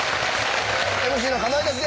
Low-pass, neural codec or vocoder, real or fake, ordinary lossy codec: none; none; real; none